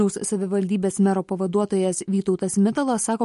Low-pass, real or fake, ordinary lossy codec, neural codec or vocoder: 14.4 kHz; real; MP3, 48 kbps; none